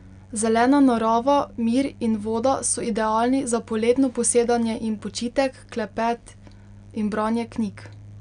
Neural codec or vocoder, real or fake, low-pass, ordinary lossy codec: none; real; 9.9 kHz; none